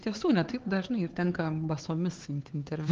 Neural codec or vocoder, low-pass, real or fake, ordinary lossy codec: codec, 16 kHz, 4 kbps, X-Codec, HuBERT features, trained on LibriSpeech; 7.2 kHz; fake; Opus, 16 kbps